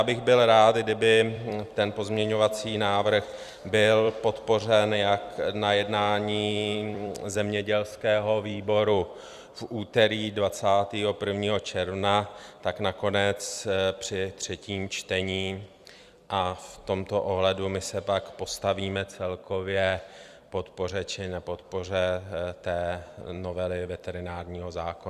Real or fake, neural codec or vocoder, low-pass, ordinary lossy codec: fake; vocoder, 44.1 kHz, 128 mel bands every 256 samples, BigVGAN v2; 14.4 kHz; Opus, 64 kbps